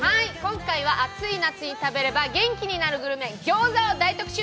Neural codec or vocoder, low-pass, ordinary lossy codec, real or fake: none; none; none; real